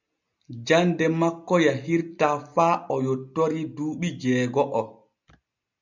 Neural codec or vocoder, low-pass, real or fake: none; 7.2 kHz; real